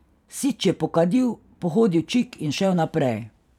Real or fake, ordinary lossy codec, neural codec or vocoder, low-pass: fake; none; vocoder, 44.1 kHz, 128 mel bands every 512 samples, BigVGAN v2; 19.8 kHz